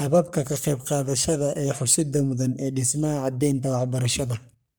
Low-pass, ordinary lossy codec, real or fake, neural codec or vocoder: none; none; fake; codec, 44.1 kHz, 3.4 kbps, Pupu-Codec